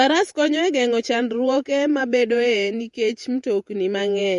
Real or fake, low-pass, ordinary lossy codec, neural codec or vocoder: fake; 14.4 kHz; MP3, 48 kbps; vocoder, 48 kHz, 128 mel bands, Vocos